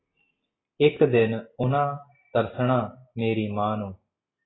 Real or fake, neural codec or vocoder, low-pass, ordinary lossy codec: real; none; 7.2 kHz; AAC, 16 kbps